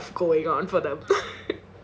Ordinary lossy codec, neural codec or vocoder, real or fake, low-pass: none; none; real; none